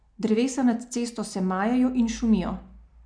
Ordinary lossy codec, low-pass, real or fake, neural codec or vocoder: none; 9.9 kHz; real; none